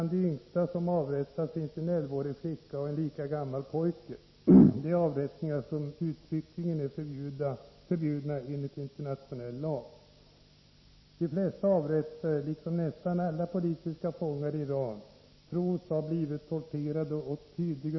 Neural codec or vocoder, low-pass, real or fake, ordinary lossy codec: none; 7.2 kHz; real; MP3, 24 kbps